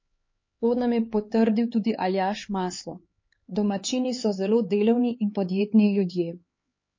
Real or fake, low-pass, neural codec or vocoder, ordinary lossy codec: fake; 7.2 kHz; codec, 16 kHz, 4 kbps, X-Codec, HuBERT features, trained on LibriSpeech; MP3, 32 kbps